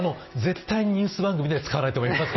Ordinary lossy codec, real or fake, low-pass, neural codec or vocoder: MP3, 24 kbps; real; 7.2 kHz; none